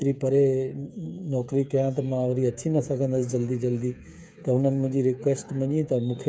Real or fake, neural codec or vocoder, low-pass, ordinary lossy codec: fake; codec, 16 kHz, 8 kbps, FreqCodec, smaller model; none; none